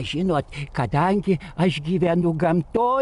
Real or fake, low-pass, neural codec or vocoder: real; 10.8 kHz; none